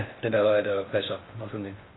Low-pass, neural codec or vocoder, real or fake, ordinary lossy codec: 7.2 kHz; codec, 16 kHz in and 24 kHz out, 0.6 kbps, FocalCodec, streaming, 4096 codes; fake; AAC, 16 kbps